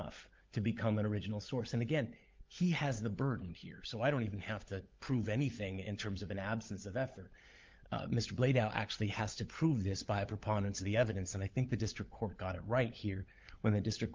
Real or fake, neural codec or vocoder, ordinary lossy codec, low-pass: fake; codec, 16 kHz, 16 kbps, FunCodec, trained on LibriTTS, 50 frames a second; Opus, 24 kbps; 7.2 kHz